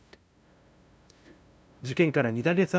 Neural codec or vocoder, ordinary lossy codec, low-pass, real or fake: codec, 16 kHz, 0.5 kbps, FunCodec, trained on LibriTTS, 25 frames a second; none; none; fake